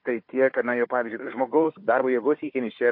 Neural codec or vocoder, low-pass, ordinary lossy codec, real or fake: codec, 16 kHz, 4 kbps, FunCodec, trained on Chinese and English, 50 frames a second; 5.4 kHz; MP3, 32 kbps; fake